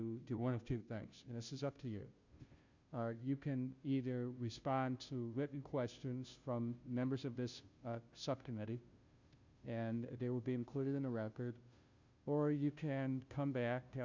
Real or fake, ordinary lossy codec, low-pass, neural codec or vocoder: fake; Opus, 64 kbps; 7.2 kHz; codec, 16 kHz, 0.5 kbps, FunCodec, trained on Chinese and English, 25 frames a second